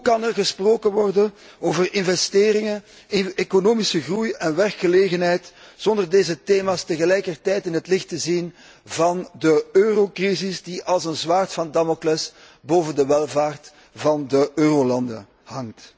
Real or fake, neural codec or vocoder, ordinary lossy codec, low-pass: real; none; none; none